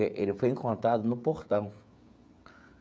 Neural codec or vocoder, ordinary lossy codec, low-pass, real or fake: codec, 16 kHz, 16 kbps, FreqCodec, smaller model; none; none; fake